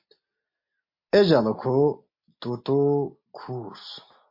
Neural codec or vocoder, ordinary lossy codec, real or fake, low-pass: none; MP3, 32 kbps; real; 5.4 kHz